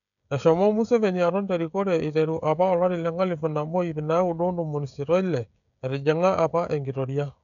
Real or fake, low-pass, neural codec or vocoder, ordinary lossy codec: fake; 7.2 kHz; codec, 16 kHz, 16 kbps, FreqCodec, smaller model; none